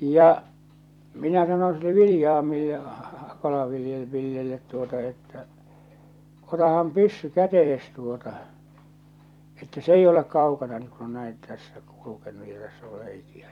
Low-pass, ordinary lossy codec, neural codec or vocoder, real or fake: 19.8 kHz; none; vocoder, 44.1 kHz, 128 mel bands every 256 samples, BigVGAN v2; fake